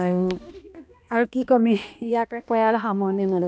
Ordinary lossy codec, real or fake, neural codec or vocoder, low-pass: none; fake; codec, 16 kHz, 1 kbps, X-Codec, HuBERT features, trained on balanced general audio; none